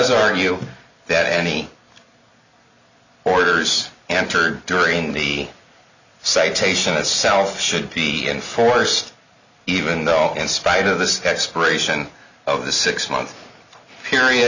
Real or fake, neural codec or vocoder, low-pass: real; none; 7.2 kHz